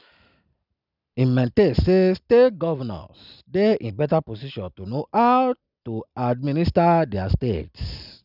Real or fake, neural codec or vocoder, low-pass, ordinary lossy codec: real; none; 5.4 kHz; none